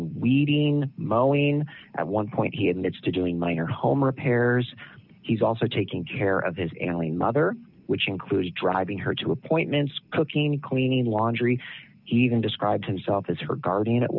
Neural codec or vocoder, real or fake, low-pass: none; real; 5.4 kHz